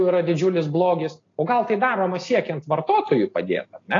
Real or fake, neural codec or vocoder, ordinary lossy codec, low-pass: real; none; AAC, 32 kbps; 7.2 kHz